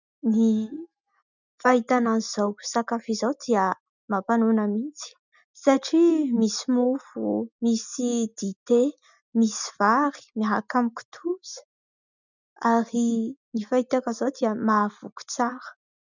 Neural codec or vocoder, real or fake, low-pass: none; real; 7.2 kHz